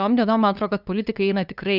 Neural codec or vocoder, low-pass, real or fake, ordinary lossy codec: codec, 16 kHz, 2 kbps, FunCodec, trained on LibriTTS, 25 frames a second; 5.4 kHz; fake; Opus, 64 kbps